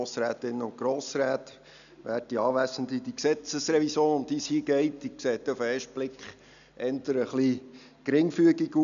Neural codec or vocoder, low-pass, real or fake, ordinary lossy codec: none; 7.2 kHz; real; none